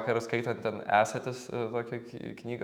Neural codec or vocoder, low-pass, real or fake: autoencoder, 48 kHz, 128 numbers a frame, DAC-VAE, trained on Japanese speech; 19.8 kHz; fake